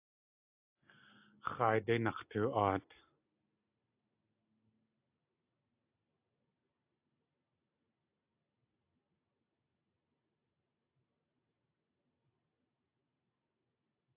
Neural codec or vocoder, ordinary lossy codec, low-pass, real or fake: none; AAC, 32 kbps; 3.6 kHz; real